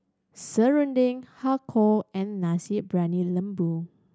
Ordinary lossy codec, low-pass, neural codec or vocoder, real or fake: none; none; none; real